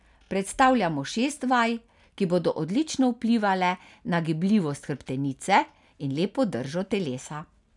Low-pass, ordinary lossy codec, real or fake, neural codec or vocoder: 10.8 kHz; none; real; none